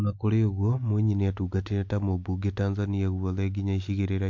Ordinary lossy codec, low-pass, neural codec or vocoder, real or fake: MP3, 48 kbps; 7.2 kHz; none; real